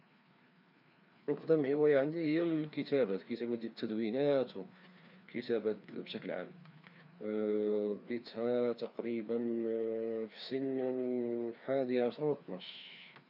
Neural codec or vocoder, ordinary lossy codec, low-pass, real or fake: codec, 16 kHz, 2 kbps, FreqCodec, larger model; none; 5.4 kHz; fake